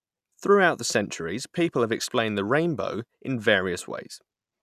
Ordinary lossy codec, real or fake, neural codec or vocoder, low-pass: none; real; none; 14.4 kHz